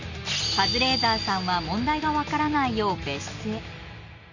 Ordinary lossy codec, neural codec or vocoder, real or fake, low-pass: none; none; real; 7.2 kHz